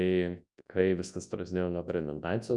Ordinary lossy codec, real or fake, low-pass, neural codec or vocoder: MP3, 96 kbps; fake; 10.8 kHz; codec, 24 kHz, 0.9 kbps, WavTokenizer, large speech release